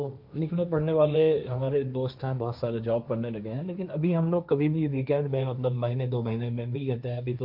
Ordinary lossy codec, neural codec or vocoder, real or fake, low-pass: none; codec, 16 kHz, 1.1 kbps, Voila-Tokenizer; fake; 5.4 kHz